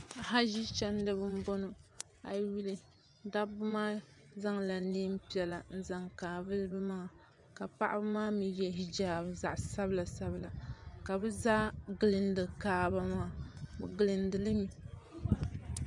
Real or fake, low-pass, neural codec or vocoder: fake; 10.8 kHz; vocoder, 24 kHz, 100 mel bands, Vocos